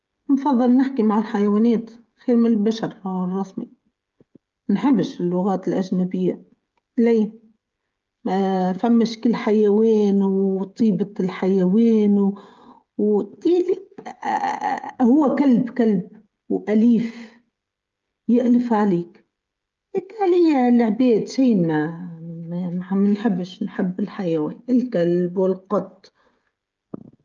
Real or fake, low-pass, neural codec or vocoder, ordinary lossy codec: fake; 7.2 kHz; codec, 16 kHz, 16 kbps, FreqCodec, smaller model; Opus, 24 kbps